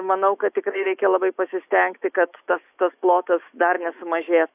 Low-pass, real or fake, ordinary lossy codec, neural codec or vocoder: 3.6 kHz; fake; AAC, 32 kbps; autoencoder, 48 kHz, 128 numbers a frame, DAC-VAE, trained on Japanese speech